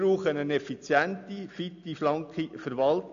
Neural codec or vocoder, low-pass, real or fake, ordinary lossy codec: none; 7.2 kHz; real; AAC, 96 kbps